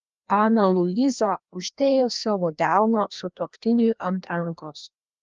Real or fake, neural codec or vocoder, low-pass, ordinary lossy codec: fake; codec, 16 kHz, 1 kbps, FreqCodec, larger model; 7.2 kHz; Opus, 24 kbps